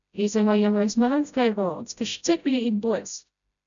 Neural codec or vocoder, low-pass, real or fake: codec, 16 kHz, 0.5 kbps, FreqCodec, smaller model; 7.2 kHz; fake